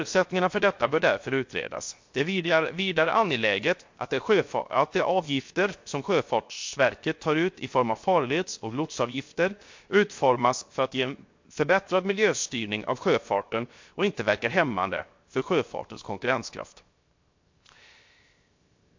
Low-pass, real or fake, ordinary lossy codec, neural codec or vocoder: 7.2 kHz; fake; MP3, 48 kbps; codec, 16 kHz, 0.7 kbps, FocalCodec